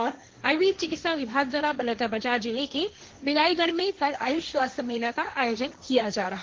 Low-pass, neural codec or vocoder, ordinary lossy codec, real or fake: 7.2 kHz; codec, 16 kHz, 1.1 kbps, Voila-Tokenizer; Opus, 16 kbps; fake